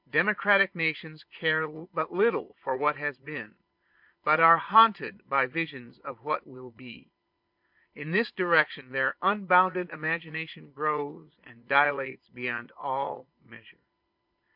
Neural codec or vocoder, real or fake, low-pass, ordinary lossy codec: vocoder, 22.05 kHz, 80 mel bands, Vocos; fake; 5.4 kHz; MP3, 48 kbps